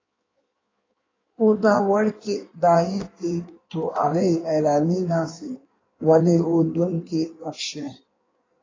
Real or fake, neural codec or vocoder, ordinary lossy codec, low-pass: fake; codec, 16 kHz in and 24 kHz out, 1.1 kbps, FireRedTTS-2 codec; AAC, 32 kbps; 7.2 kHz